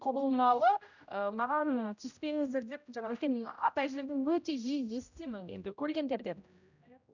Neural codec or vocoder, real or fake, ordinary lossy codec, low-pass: codec, 16 kHz, 0.5 kbps, X-Codec, HuBERT features, trained on general audio; fake; none; 7.2 kHz